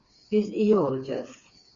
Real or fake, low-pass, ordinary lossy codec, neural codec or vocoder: fake; 7.2 kHz; Opus, 64 kbps; codec, 16 kHz, 4 kbps, FreqCodec, smaller model